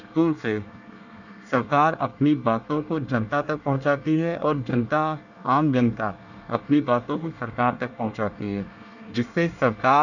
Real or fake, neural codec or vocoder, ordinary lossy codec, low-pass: fake; codec, 24 kHz, 1 kbps, SNAC; none; 7.2 kHz